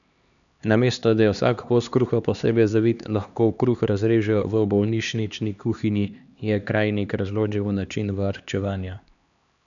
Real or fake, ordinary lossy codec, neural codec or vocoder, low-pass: fake; none; codec, 16 kHz, 2 kbps, X-Codec, HuBERT features, trained on LibriSpeech; 7.2 kHz